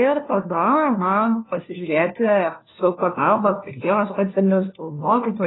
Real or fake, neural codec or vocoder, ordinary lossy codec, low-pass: fake; codec, 16 kHz, 1 kbps, FunCodec, trained on LibriTTS, 50 frames a second; AAC, 16 kbps; 7.2 kHz